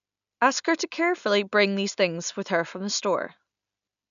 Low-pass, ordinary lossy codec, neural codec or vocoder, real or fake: 7.2 kHz; none; none; real